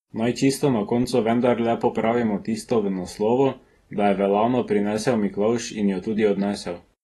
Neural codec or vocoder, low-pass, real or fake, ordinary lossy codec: none; 19.8 kHz; real; AAC, 32 kbps